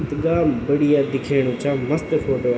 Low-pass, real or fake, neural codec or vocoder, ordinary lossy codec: none; real; none; none